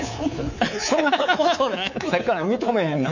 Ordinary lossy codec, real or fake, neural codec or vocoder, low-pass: none; fake; codec, 24 kHz, 3.1 kbps, DualCodec; 7.2 kHz